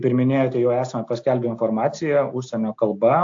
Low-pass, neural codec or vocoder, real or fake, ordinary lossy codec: 7.2 kHz; none; real; AAC, 48 kbps